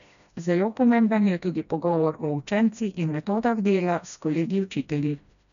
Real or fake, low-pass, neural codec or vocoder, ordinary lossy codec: fake; 7.2 kHz; codec, 16 kHz, 1 kbps, FreqCodec, smaller model; none